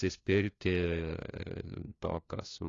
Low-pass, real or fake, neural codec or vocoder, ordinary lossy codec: 7.2 kHz; fake; codec, 16 kHz, 1 kbps, FunCodec, trained on LibriTTS, 50 frames a second; AAC, 32 kbps